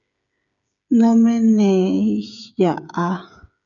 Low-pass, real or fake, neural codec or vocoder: 7.2 kHz; fake; codec, 16 kHz, 8 kbps, FreqCodec, smaller model